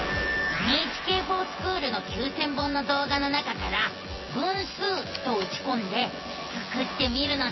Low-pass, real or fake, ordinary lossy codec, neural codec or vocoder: 7.2 kHz; fake; MP3, 24 kbps; vocoder, 24 kHz, 100 mel bands, Vocos